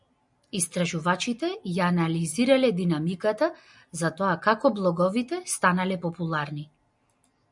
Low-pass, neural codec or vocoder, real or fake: 10.8 kHz; none; real